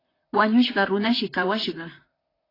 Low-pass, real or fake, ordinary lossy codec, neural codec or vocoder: 5.4 kHz; fake; AAC, 24 kbps; vocoder, 44.1 kHz, 128 mel bands, Pupu-Vocoder